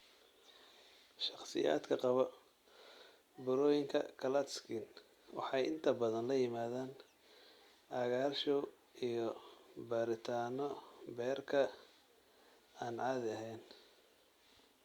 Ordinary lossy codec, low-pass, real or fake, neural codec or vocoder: Opus, 64 kbps; 19.8 kHz; real; none